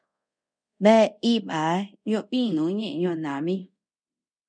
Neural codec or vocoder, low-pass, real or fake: codec, 24 kHz, 0.5 kbps, DualCodec; 9.9 kHz; fake